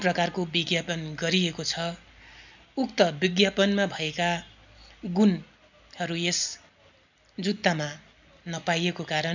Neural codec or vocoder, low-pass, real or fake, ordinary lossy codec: none; 7.2 kHz; real; none